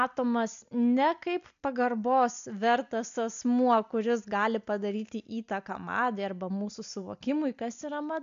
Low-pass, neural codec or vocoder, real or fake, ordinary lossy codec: 7.2 kHz; none; real; AAC, 96 kbps